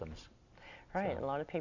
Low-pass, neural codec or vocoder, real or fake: 7.2 kHz; none; real